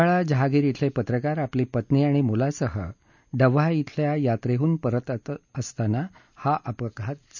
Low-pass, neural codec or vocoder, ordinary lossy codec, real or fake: 7.2 kHz; none; none; real